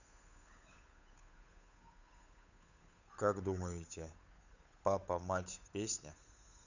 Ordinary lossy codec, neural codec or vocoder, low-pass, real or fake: none; codec, 16 kHz, 8 kbps, FunCodec, trained on Chinese and English, 25 frames a second; 7.2 kHz; fake